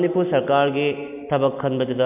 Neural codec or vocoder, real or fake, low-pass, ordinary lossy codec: none; real; 3.6 kHz; AAC, 32 kbps